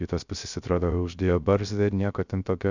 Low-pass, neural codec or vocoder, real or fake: 7.2 kHz; codec, 16 kHz, 0.3 kbps, FocalCodec; fake